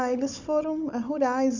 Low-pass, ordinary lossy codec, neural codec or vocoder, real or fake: 7.2 kHz; none; codec, 16 kHz, 16 kbps, FunCodec, trained on Chinese and English, 50 frames a second; fake